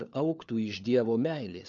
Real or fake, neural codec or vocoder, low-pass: fake; codec, 16 kHz, 4 kbps, FunCodec, trained on LibriTTS, 50 frames a second; 7.2 kHz